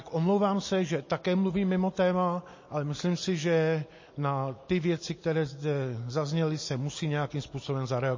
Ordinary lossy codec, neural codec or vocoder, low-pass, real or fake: MP3, 32 kbps; none; 7.2 kHz; real